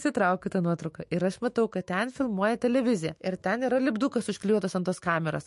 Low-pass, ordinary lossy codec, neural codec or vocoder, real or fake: 14.4 kHz; MP3, 48 kbps; autoencoder, 48 kHz, 128 numbers a frame, DAC-VAE, trained on Japanese speech; fake